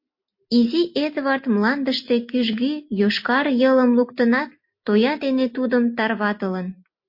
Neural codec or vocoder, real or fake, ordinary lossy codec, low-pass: none; real; MP3, 32 kbps; 5.4 kHz